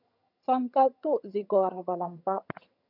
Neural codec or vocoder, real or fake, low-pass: vocoder, 22.05 kHz, 80 mel bands, HiFi-GAN; fake; 5.4 kHz